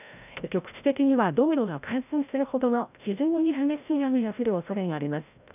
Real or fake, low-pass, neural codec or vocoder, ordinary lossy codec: fake; 3.6 kHz; codec, 16 kHz, 0.5 kbps, FreqCodec, larger model; none